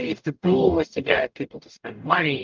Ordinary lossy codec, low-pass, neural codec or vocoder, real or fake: Opus, 24 kbps; 7.2 kHz; codec, 44.1 kHz, 0.9 kbps, DAC; fake